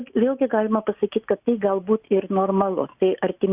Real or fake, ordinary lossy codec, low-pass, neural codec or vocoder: real; Opus, 64 kbps; 3.6 kHz; none